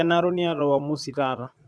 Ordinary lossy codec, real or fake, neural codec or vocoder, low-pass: none; fake; vocoder, 22.05 kHz, 80 mel bands, Vocos; none